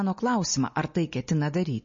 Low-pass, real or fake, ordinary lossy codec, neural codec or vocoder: 7.2 kHz; real; MP3, 32 kbps; none